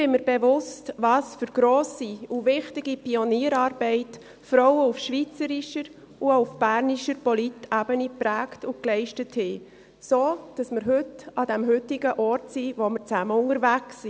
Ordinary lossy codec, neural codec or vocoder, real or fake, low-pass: none; none; real; none